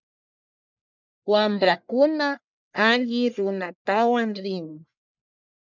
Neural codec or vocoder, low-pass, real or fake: codec, 44.1 kHz, 1.7 kbps, Pupu-Codec; 7.2 kHz; fake